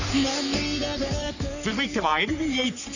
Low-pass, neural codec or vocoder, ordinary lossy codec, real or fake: 7.2 kHz; codec, 44.1 kHz, 3.4 kbps, Pupu-Codec; none; fake